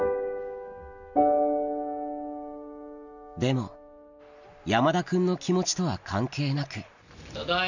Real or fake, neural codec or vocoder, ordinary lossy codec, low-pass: real; none; none; 7.2 kHz